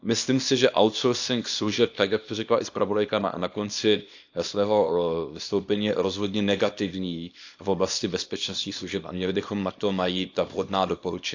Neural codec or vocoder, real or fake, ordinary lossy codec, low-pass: codec, 24 kHz, 0.9 kbps, WavTokenizer, small release; fake; AAC, 48 kbps; 7.2 kHz